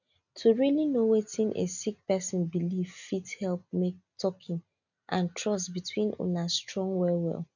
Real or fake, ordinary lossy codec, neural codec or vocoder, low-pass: real; none; none; 7.2 kHz